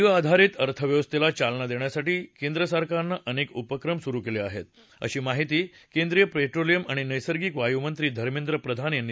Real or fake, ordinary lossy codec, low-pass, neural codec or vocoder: real; none; none; none